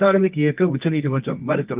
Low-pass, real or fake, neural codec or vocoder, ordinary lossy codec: 3.6 kHz; fake; codec, 24 kHz, 0.9 kbps, WavTokenizer, medium music audio release; Opus, 24 kbps